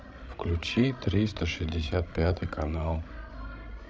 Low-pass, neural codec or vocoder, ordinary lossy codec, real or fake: none; codec, 16 kHz, 16 kbps, FreqCodec, larger model; none; fake